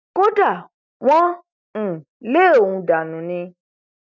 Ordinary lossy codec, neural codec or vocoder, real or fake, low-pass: none; none; real; 7.2 kHz